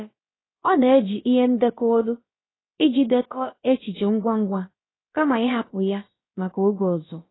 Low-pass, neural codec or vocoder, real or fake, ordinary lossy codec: 7.2 kHz; codec, 16 kHz, about 1 kbps, DyCAST, with the encoder's durations; fake; AAC, 16 kbps